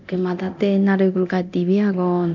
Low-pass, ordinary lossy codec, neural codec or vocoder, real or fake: 7.2 kHz; none; codec, 24 kHz, 0.9 kbps, DualCodec; fake